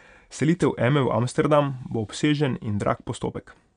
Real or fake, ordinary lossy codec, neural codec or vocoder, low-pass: real; none; none; 9.9 kHz